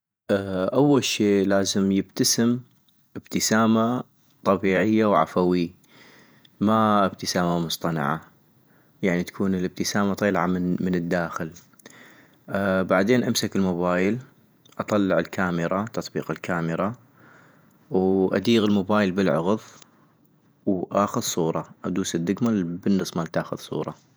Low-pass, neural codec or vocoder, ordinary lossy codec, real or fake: none; vocoder, 48 kHz, 128 mel bands, Vocos; none; fake